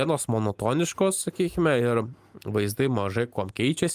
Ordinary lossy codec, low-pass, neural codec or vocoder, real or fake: Opus, 32 kbps; 14.4 kHz; none; real